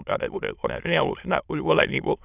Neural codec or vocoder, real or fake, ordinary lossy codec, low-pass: autoencoder, 22.05 kHz, a latent of 192 numbers a frame, VITS, trained on many speakers; fake; none; 3.6 kHz